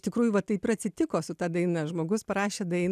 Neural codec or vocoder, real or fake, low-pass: none; real; 14.4 kHz